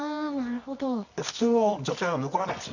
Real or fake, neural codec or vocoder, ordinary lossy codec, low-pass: fake; codec, 24 kHz, 0.9 kbps, WavTokenizer, medium music audio release; none; 7.2 kHz